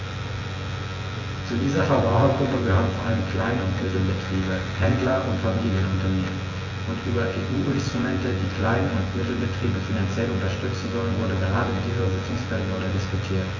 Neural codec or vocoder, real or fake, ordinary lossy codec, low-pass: vocoder, 24 kHz, 100 mel bands, Vocos; fake; AAC, 32 kbps; 7.2 kHz